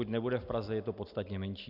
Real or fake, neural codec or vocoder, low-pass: real; none; 5.4 kHz